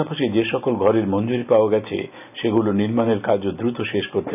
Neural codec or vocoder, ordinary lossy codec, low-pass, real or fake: none; none; 3.6 kHz; real